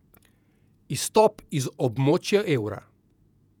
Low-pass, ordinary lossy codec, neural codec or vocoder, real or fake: 19.8 kHz; none; vocoder, 48 kHz, 128 mel bands, Vocos; fake